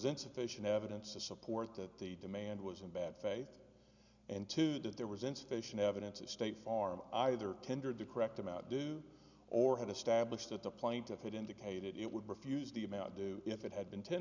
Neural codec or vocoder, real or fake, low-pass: none; real; 7.2 kHz